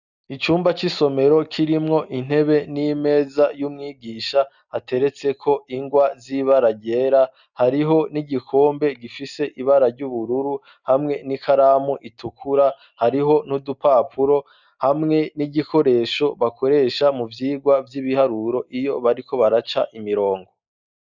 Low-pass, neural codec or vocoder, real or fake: 7.2 kHz; none; real